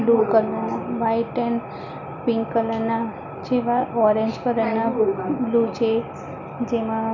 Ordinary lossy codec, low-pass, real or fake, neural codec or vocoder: none; 7.2 kHz; real; none